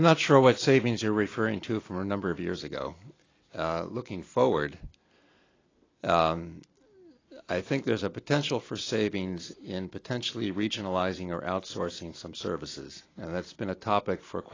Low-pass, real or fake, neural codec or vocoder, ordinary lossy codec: 7.2 kHz; real; none; AAC, 32 kbps